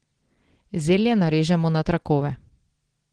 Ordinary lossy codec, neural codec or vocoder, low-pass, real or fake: Opus, 16 kbps; none; 9.9 kHz; real